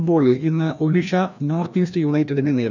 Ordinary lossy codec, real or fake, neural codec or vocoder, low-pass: none; fake; codec, 16 kHz, 1 kbps, FreqCodec, larger model; 7.2 kHz